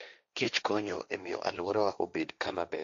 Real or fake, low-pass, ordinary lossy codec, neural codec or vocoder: fake; 7.2 kHz; AAC, 96 kbps; codec, 16 kHz, 1.1 kbps, Voila-Tokenizer